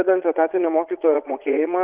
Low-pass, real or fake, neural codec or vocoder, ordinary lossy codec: 3.6 kHz; fake; vocoder, 22.05 kHz, 80 mel bands, Vocos; Opus, 64 kbps